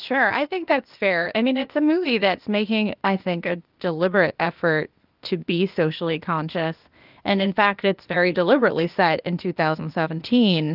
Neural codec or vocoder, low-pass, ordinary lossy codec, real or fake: codec, 16 kHz, 0.8 kbps, ZipCodec; 5.4 kHz; Opus, 32 kbps; fake